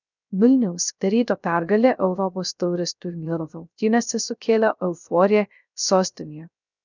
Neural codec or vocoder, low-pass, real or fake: codec, 16 kHz, 0.3 kbps, FocalCodec; 7.2 kHz; fake